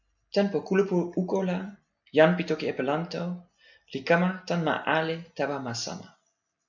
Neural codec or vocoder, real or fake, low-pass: none; real; 7.2 kHz